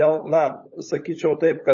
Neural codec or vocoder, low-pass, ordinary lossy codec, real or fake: codec, 16 kHz, 16 kbps, FunCodec, trained on LibriTTS, 50 frames a second; 7.2 kHz; MP3, 32 kbps; fake